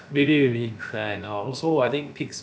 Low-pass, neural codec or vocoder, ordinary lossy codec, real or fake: none; codec, 16 kHz, about 1 kbps, DyCAST, with the encoder's durations; none; fake